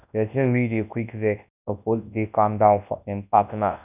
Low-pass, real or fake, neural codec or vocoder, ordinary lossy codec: 3.6 kHz; fake; codec, 24 kHz, 0.9 kbps, WavTokenizer, large speech release; none